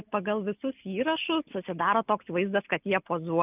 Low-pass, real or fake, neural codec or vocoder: 3.6 kHz; real; none